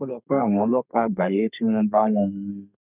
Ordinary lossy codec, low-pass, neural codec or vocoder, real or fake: none; 3.6 kHz; codec, 32 kHz, 1.9 kbps, SNAC; fake